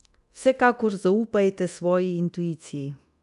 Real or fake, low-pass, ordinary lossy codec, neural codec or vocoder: fake; 10.8 kHz; none; codec, 24 kHz, 0.9 kbps, DualCodec